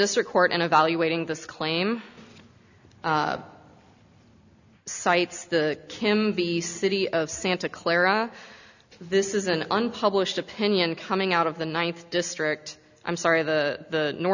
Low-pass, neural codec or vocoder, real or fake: 7.2 kHz; none; real